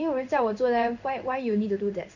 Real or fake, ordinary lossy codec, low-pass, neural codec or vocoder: fake; none; 7.2 kHz; codec, 16 kHz in and 24 kHz out, 1 kbps, XY-Tokenizer